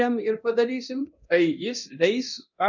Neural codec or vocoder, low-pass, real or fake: codec, 24 kHz, 0.9 kbps, DualCodec; 7.2 kHz; fake